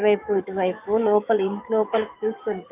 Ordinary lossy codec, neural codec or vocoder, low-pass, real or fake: none; codec, 44.1 kHz, 7.8 kbps, DAC; 3.6 kHz; fake